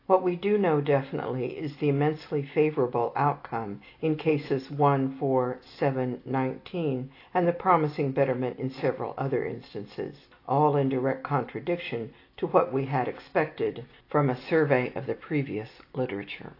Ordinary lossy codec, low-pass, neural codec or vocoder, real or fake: AAC, 32 kbps; 5.4 kHz; none; real